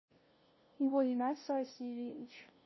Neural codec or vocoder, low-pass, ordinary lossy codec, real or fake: codec, 16 kHz, 0.5 kbps, FunCodec, trained on LibriTTS, 25 frames a second; 7.2 kHz; MP3, 24 kbps; fake